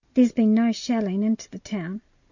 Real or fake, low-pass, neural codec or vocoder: real; 7.2 kHz; none